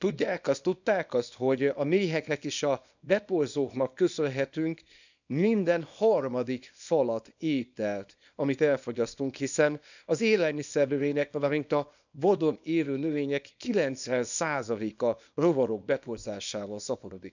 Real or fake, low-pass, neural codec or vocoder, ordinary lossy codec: fake; 7.2 kHz; codec, 24 kHz, 0.9 kbps, WavTokenizer, small release; none